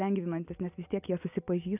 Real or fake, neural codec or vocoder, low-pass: real; none; 3.6 kHz